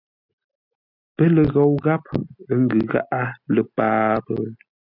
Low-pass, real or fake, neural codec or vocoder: 5.4 kHz; real; none